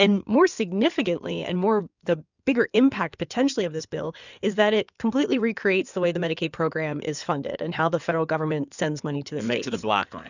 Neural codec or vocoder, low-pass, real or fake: codec, 16 kHz in and 24 kHz out, 2.2 kbps, FireRedTTS-2 codec; 7.2 kHz; fake